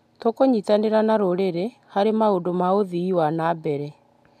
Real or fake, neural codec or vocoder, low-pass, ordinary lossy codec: real; none; 14.4 kHz; none